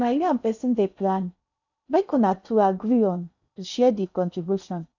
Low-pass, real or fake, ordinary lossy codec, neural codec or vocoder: 7.2 kHz; fake; none; codec, 16 kHz in and 24 kHz out, 0.6 kbps, FocalCodec, streaming, 2048 codes